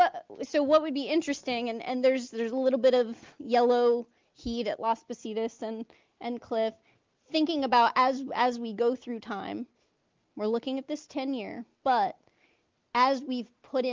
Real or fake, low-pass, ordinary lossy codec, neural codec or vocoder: real; 7.2 kHz; Opus, 32 kbps; none